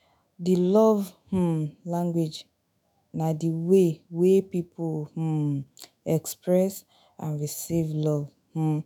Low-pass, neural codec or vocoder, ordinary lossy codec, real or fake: none; autoencoder, 48 kHz, 128 numbers a frame, DAC-VAE, trained on Japanese speech; none; fake